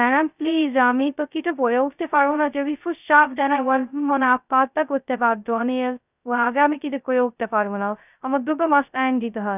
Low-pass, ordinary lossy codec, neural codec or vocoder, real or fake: 3.6 kHz; none; codec, 16 kHz, 0.2 kbps, FocalCodec; fake